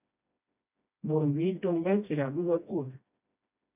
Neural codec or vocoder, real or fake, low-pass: codec, 16 kHz, 1 kbps, FreqCodec, smaller model; fake; 3.6 kHz